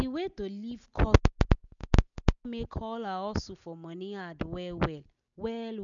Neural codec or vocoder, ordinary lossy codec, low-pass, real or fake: none; none; 7.2 kHz; real